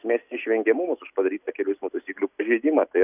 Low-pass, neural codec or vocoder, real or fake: 3.6 kHz; none; real